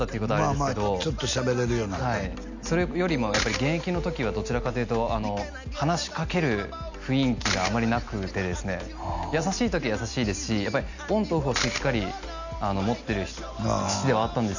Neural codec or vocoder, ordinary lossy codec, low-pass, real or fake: none; none; 7.2 kHz; real